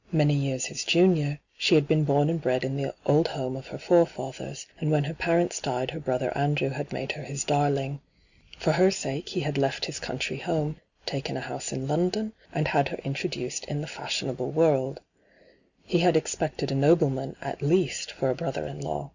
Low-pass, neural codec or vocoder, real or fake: 7.2 kHz; none; real